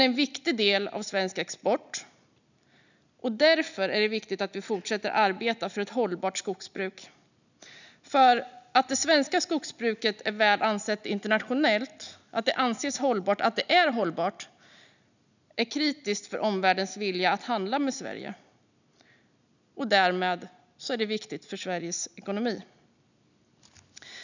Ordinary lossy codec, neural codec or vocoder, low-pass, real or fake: none; none; 7.2 kHz; real